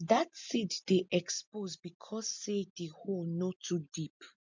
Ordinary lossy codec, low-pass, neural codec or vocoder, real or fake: MP3, 64 kbps; 7.2 kHz; none; real